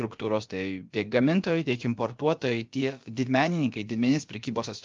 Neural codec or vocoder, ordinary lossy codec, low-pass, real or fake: codec, 16 kHz, about 1 kbps, DyCAST, with the encoder's durations; Opus, 32 kbps; 7.2 kHz; fake